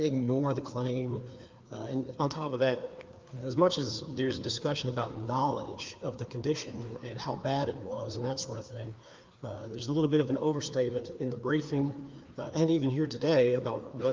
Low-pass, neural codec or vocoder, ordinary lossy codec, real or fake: 7.2 kHz; codec, 16 kHz, 2 kbps, FreqCodec, larger model; Opus, 32 kbps; fake